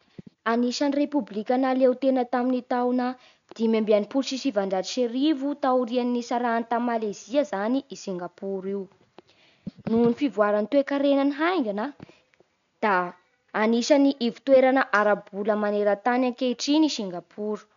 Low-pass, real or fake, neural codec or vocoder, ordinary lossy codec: 7.2 kHz; real; none; none